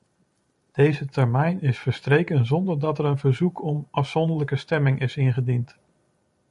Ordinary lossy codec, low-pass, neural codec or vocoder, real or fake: MP3, 48 kbps; 14.4 kHz; vocoder, 44.1 kHz, 128 mel bands every 512 samples, BigVGAN v2; fake